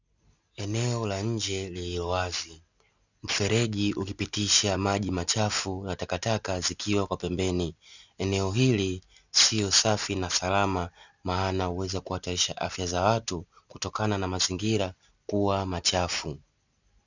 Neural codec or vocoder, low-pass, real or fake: none; 7.2 kHz; real